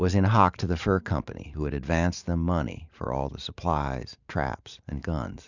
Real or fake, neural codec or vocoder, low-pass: fake; vocoder, 44.1 kHz, 128 mel bands every 512 samples, BigVGAN v2; 7.2 kHz